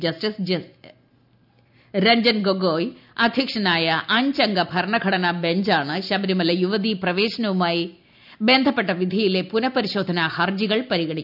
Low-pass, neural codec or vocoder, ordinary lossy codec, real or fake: 5.4 kHz; none; none; real